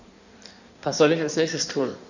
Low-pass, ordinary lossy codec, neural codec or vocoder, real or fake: 7.2 kHz; none; codec, 16 kHz in and 24 kHz out, 1.1 kbps, FireRedTTS-2 codec; fake